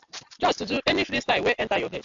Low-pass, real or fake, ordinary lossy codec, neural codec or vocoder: 7.2 kHz; real; AAC, 64 kbps; none